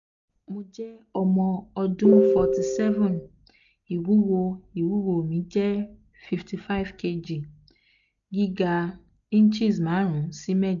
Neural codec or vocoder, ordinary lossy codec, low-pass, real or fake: none; none; 7.2 kHz; real